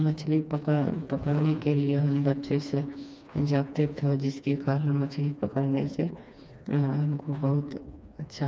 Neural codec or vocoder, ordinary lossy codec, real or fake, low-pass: codec, 16 kHz, 2 kbps, FreqCodec, smaller model; none; fake; none